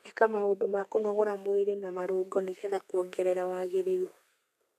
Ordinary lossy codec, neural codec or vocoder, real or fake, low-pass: none; codec, 32 kHz, 1.9 kbps, SNAC; fake; 14.4 kHz